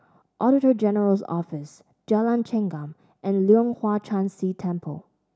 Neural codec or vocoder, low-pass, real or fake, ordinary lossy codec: none; none; real; none